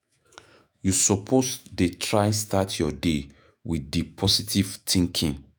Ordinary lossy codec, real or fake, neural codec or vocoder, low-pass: none; fake; autoencoder, 48 kHz, 128 numbers a frame, DAC-VAE, trained on Japanese speech; none